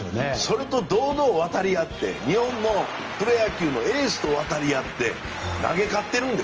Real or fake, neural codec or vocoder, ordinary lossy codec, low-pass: real; none; Opus, 24 kbps; 7.2 kHz